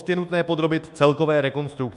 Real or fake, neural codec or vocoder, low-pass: fake; codec, 24 kHz, 1.2 kbps, DualCodec; 10.8 kHz